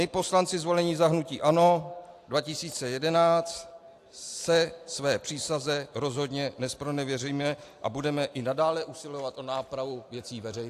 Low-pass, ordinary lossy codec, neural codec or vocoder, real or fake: 14.4 kHz; AAC, 64 kbps; none; real